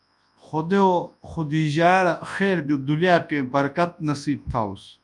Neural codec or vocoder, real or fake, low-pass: codec, 24 kHz, 0.9 kbps, WavTokenizer, large speech release; fake; 10.8 kHz